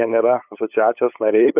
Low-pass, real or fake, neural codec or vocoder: 3.6 kHz; fake; codec, 16 kHz, 8 kbps, FunCodec, trained on LibriTTS, 25 frames a second